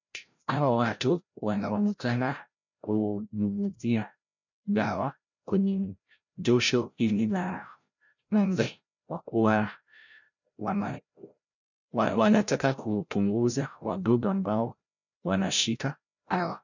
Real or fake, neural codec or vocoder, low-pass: fake; codec, 16 kHz, 0.5 kbps, FreqCodec, larger model; 7.2 kHz